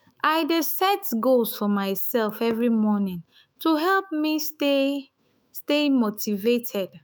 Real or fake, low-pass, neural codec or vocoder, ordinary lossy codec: fake; none; autoencoder, 48 kHz, 128 numbers a frame, DAC-VAE, trained on Japanese speech; none